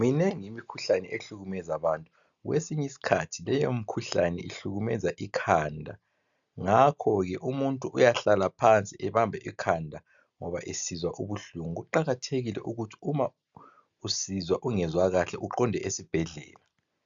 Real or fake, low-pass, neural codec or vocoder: real; 7.2 kHz; none